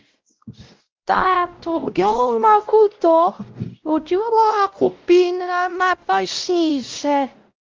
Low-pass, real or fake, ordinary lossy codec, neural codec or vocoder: 7.2 kHz; fake; Opus, 32 kbps; codec, 16 kHz, 0.5 kbps, X-Codec, WavLM features, trained on Multilingual LibriSpeech